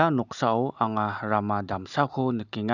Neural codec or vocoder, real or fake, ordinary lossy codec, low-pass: none; real; none; 7.2 kHz